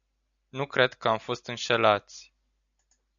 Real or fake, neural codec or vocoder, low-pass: real; none; 7.2 kHz